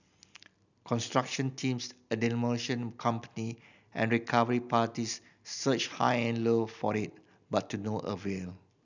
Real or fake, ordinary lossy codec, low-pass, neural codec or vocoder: real; none; 7.2 kHz; none